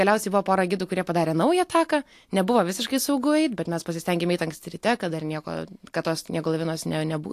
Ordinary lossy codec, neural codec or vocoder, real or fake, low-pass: AAC, 64 kbps; none; real; 14.4 kHz